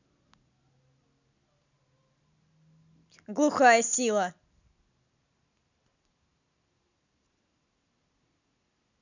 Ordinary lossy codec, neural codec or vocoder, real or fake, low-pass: none; none; real; 7.2 kHz